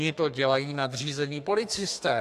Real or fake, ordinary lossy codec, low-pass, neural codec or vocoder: fake; AAC, 64 kbps; 14.4 kHz; codec, 32 kHz, 1.9 kbps, SNAC